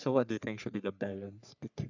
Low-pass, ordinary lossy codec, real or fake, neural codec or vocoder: 7.2 kHz; none; fake; codec, 44.1 kHz, 3.4 kbps, Pupu-Codec